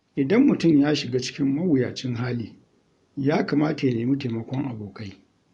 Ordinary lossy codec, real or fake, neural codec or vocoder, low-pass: none; fake; vocoder, 24 kHz, 100 mel bands, Vocos; 10.8 kHz